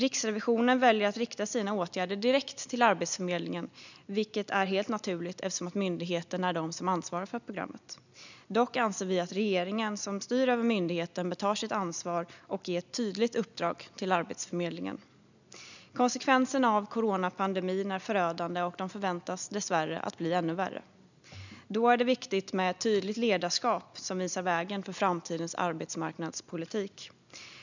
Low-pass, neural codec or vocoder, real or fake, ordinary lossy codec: 7.2 kHz; none; real; none